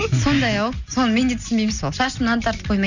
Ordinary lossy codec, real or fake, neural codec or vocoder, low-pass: none; real; none; 7.2 kHz